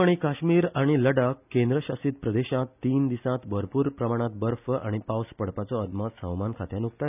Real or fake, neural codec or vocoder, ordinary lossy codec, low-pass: real; none; none; 3.6 kHz